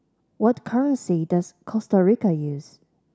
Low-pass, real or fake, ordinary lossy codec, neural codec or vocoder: none; real; none; none